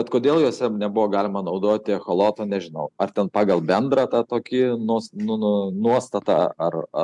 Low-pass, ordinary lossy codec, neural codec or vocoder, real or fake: 10.8 kHz; MP3, 96 kbps; none; real